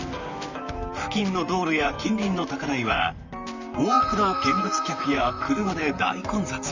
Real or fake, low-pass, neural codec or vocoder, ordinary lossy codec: fake; 7.2 kHz; vocoder, 44.1 kHz, 128 mel bands, Pupu-Vocoder; Opus, 64 kbps